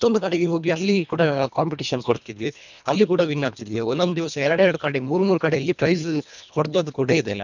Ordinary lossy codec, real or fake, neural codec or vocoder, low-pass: none; fake; codec, 24 kHz, 1.5 kbps, HILCodec; 7.2 kHz